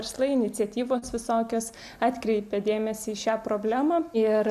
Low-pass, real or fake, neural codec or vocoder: 14.4 kHz; real; none